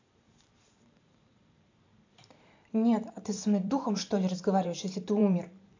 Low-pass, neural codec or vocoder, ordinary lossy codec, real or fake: 7.2 kHz; vocoder, 44.1 kHz, 128 mel bands every 512 samples, BigVGAN v2; AAC, 48 kbps; fake